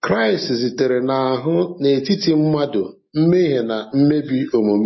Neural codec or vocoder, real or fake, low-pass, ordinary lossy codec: none; real; 7.2 kHz; MP3, 24 kbps